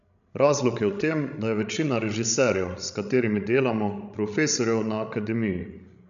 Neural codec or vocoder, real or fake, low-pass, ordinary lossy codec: codec, 16 kHz, 16 kbps, FreqCodec, larger model; fake; 7.2 kHz; none